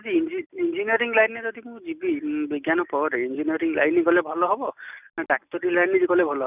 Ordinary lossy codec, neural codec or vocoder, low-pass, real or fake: none; none; 3.6 kHz; real